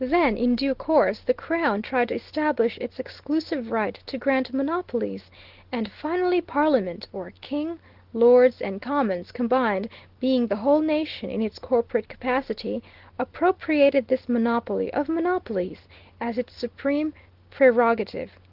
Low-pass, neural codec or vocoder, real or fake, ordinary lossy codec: 5.4 kHz; none; real; Opus, 16 kbps